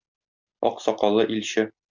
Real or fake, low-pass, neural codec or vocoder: real; 7.2 kHz; none